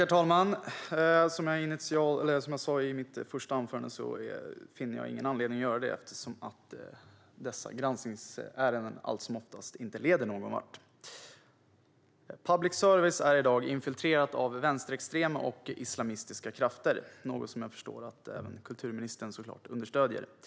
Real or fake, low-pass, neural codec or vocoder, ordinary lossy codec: real; none; none; none